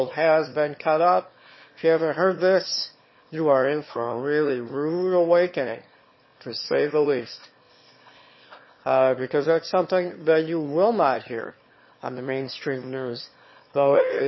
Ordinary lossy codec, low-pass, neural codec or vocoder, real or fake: MP3, 24 kbps; 7.2 kHz; autoencoder, 22.05 kHz, a latent of 192 numbers a frame, VITS, trained on one speaker; fake